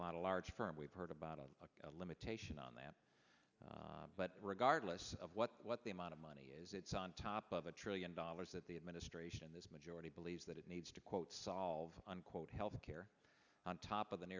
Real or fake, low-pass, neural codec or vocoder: real; 7.2 kHz; none